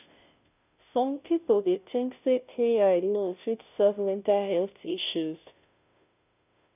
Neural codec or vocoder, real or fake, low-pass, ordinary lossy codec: codec, 16 kHz, 0.5 kbps, FunCodec, trained on Chinese and English, 25 frames a second; fake; 3.6 kHz; none